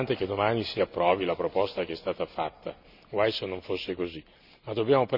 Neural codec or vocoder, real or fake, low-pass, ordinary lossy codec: none; real; 5.4 kHz; none